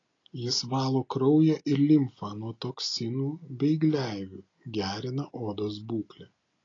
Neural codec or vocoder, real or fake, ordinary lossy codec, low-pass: none; real; AAC, 32 kbps; 7.2 kHz